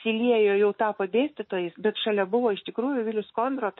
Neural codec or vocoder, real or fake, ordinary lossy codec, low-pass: autoencoder, 48 kHz, 128 numbers a frame, DAC-VAE, trained on Japanese speech; fake; MP3, 24 kbps; 7.2 kHz